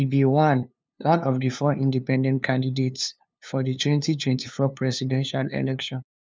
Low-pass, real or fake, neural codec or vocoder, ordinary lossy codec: none; fake; codec, 16 kHz, 2 kbps, FunCodec, trained on LibriTTS, 25 frames a second; none